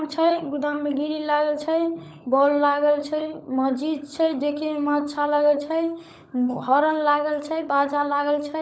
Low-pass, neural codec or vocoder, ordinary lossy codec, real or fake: none; codec, 16 kHz, 16 kbps, FunCodec, trained on LibriTTS, 50 frames a second; none; fake